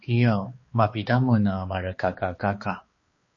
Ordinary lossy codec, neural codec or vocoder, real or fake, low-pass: MP3, 32 kbps; codec, 16 kHz, 2 kbps, X-Codec, HuBERT features, trained on balanced general audio; fake; 7.2 kHz